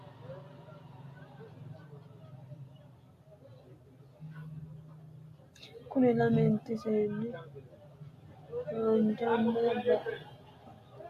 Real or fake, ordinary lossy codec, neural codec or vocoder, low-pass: real; AAC, 64 kbps; none; 14.4 kHz